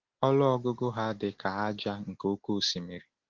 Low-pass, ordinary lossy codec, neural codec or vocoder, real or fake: 7.2 kHz; Opus, 16 kbps; none; real